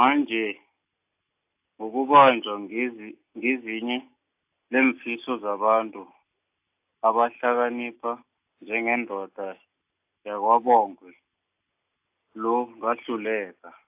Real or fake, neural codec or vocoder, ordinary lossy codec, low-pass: real; none; AAC, 32 kbps; 3.6 kHz